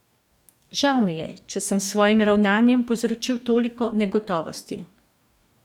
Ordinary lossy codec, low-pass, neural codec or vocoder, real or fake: none; 19.8 kHz; codec, 44.1 kHz, 2.6 kbps, DAC; fake